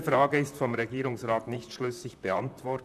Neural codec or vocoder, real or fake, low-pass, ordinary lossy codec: vocoder, 44.1 kHz, 128 mel bands, Pupu-Vocoder; fake; 14.4 kHz; none